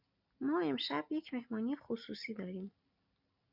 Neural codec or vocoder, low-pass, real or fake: none; 5.4 kHz; real